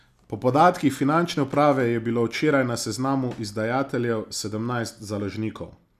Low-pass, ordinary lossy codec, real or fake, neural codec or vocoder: 14.4 kHz; none; real; none